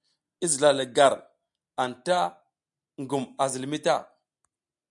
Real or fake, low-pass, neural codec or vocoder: real; 10.8 kHz; none